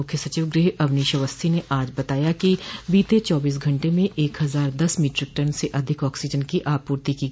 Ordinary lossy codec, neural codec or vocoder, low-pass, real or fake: none; none; none; real